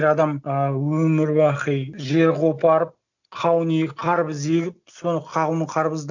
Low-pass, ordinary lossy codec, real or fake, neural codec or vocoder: 7.2 kHz; none; real; none